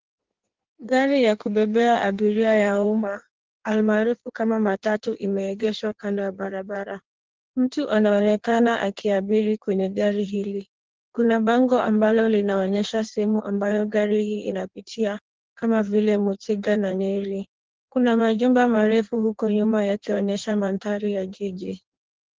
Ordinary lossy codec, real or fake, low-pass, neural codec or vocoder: Opus, 16 kbps; fake; 7.2 kHz; codec, 16 kHz in and 24 kHz out, 1.1 kbps, FireRedTTS-2 codec